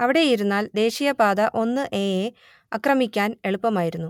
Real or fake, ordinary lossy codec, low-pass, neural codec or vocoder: real; MP3, 96 kbps; 19.8 kHz; none